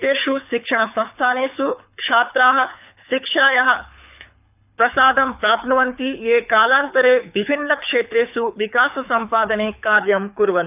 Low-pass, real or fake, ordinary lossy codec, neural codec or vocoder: 3.6 kHz; fake; none; codec, 24 kHz, 6 kbps, HILCodec